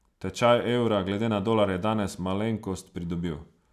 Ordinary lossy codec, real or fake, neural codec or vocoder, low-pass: none; real; none; 14.4 kHz